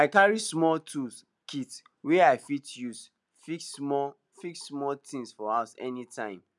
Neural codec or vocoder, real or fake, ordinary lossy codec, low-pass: none; real; none; none